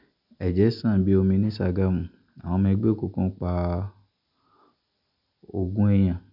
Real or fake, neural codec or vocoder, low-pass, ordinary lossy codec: real; none; 5.4 kHz; none